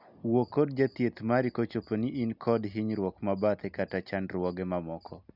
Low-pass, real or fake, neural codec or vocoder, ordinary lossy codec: 5.4 kHz; real; none; none